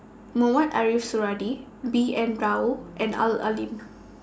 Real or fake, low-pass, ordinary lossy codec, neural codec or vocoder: real; none; none; none